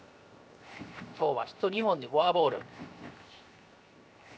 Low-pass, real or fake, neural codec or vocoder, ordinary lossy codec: none; fake; codec, 16 kHz, 0.7 kbps, FocalCodec; none